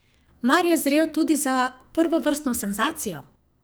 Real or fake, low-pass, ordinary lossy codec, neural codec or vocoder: fake; none; none; codec, 44.1 kHz, 2.6 kbps, SNAC